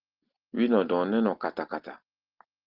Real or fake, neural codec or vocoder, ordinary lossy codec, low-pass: real; none; Opus, 16 kbps; 5.4 kHz